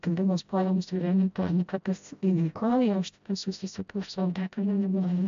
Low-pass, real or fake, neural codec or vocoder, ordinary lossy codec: 7.2 kHz; fake; codec, 16 kHz, 0.5 kbps, FreqCodec, smaller model; AAC, 64 kbps